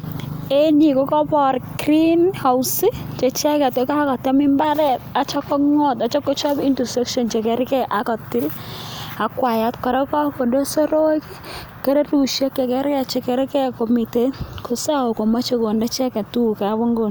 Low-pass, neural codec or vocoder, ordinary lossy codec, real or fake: none; none; none; real